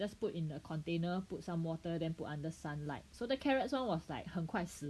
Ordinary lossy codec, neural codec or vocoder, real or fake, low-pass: none; none; real; none